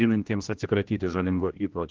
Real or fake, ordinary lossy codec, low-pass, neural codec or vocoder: fake; Opus, 16 kbps; 7.2 kHz; codec, 16 kHz, 1 kbps, X-Codec, HuBERT features, trained on general audio